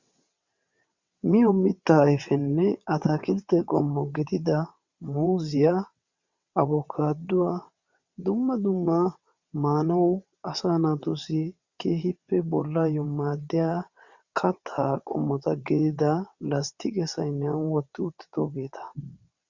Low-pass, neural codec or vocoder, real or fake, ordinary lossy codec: 7.2 kHz; vocoder, 22.05 kHz, 80 mel bands, WaveNeXt; fake; Opus, 64 kbps